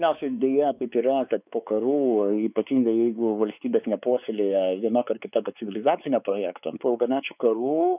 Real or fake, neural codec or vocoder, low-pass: fake; codec, 16 kHz, 4 kbps, X-Codec, WavLM features, trained on Multilingual LibriSpeech; 3.6 kHz